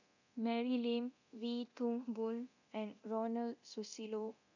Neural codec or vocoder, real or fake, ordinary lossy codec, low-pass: codec, 24 kHz, 0.9 kbps, DualCodec; fake; none; 7.2 kHz